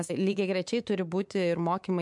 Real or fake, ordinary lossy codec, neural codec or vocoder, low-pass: fake; MP3, 64 kbps; codec, 24 kHz, 3.1 kbps, DualCodec; 10.8 kHz